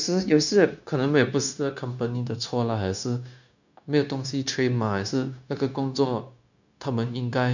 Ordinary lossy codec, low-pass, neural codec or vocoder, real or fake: none; 7.2 kHz; codec, 16 kHz, 0.9 kbps, LongCat-Audio-Codec; fake